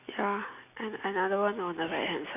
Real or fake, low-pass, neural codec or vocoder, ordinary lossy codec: real; 3.6 kHz; none; none